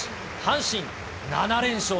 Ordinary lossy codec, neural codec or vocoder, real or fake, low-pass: none; none; real; none